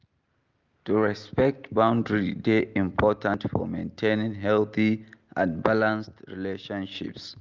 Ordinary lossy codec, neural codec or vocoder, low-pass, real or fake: Opus, 32 kbps; none; 7.2 kHz; real